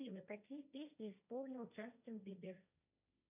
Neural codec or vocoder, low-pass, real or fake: codec, 16 kHz, 1.1 kbps, Voila-Tokenizer; 3.6 kHz; fake